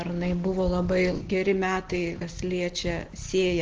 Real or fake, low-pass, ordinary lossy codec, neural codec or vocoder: real; 7.2 kHz; Opus, 16 kbps; none